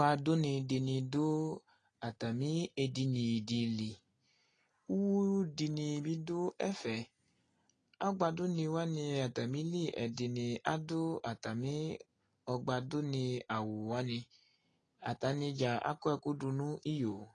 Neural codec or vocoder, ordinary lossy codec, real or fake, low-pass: none; AAC, 32 kbps; real; 9.9 kHz